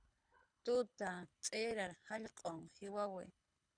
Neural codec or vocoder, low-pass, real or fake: codec, 24 kHz, 6 kbps, HILCodec; 9.9 kHz; fake